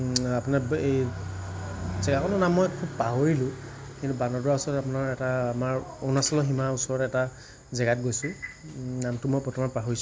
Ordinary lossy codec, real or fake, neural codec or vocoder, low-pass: none; real; none; none